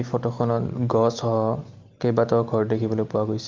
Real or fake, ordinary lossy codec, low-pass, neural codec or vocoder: real; Opus, 32 kbps; 7.2 kHz; none